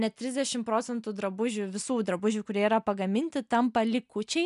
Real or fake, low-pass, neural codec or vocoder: real; 10.8 kHz; none